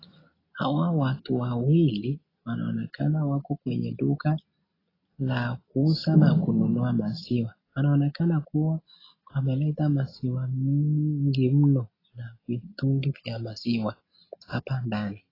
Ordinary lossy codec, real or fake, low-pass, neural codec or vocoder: AAC, 24 kbps; real; 5.4 kHz; none